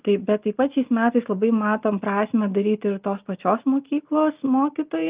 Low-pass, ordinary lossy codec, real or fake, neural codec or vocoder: 3.6 kHz; Opus, 24 kbps; real; none